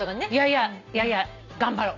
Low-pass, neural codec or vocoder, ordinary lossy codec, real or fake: 7.2 kHz; none; none; real